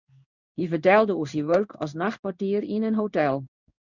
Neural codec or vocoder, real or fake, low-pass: codec, 16 kHz in and 24 kHz out, 1 kbps, XY-Tokenizer; fake; 7.2 kHz